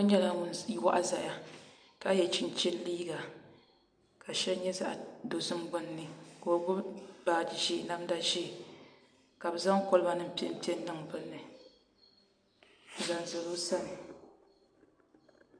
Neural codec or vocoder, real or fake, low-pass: none; real; 9.9 kHz